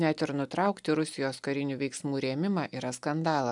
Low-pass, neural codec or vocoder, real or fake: 10.8 kHz; none; real